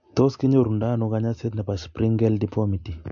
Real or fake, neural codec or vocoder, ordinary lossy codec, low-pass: real; none; MP3, 48 kbps; 7.2 kHz